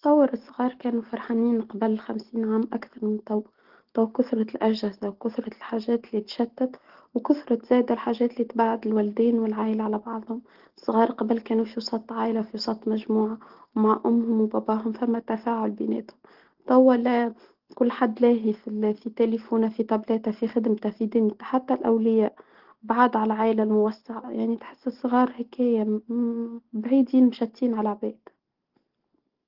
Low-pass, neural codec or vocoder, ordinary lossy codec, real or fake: 5.4 kHz; none; Opus, 16 kbps; real